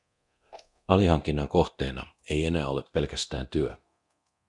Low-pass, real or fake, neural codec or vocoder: 10.8 kHz; fake; codec, 24 kHz, 0.9 kbps, DualCodec